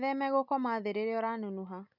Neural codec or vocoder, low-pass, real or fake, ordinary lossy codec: none; 5.4 kHz; real; none